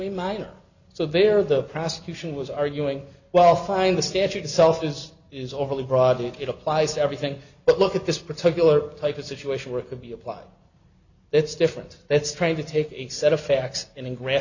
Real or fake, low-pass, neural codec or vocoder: real; 7.2 kHz; none